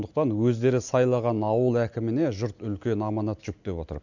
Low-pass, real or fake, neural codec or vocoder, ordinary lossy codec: 7.2 kHz; real; none; none